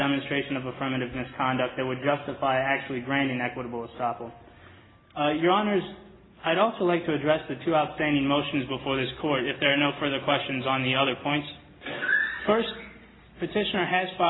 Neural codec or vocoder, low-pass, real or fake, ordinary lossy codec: none; 7.2 kHz; real; AAC, 16 kbps